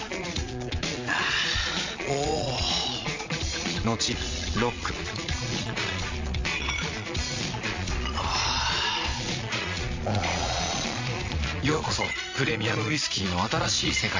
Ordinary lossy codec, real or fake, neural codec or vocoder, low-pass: MP3, 48 kbps; fake; vocoder, 22.05 kHz, 80 mel bands, Vocos; 7.2 kHz